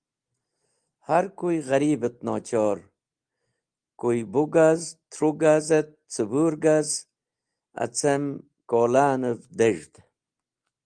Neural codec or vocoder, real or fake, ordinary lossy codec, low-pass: none; real; Opus, 24 kbps; 9.9 kHz